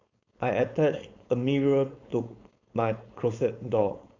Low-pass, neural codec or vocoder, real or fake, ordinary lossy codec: 7.2 kHz; codec, 16 kHz, 4.8 kbps, FACodec; fake; AAC, 48 kbps